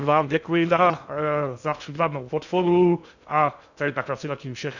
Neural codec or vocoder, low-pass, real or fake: codec, 16 kHz in and 24 kHz out, 0.6 kbps, FocalCodec, streaming, 2048 codes; 7.2 kHz; fake